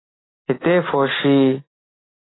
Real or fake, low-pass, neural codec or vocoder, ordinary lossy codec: real; 7.2 kHz; none; AAC, 16 kbps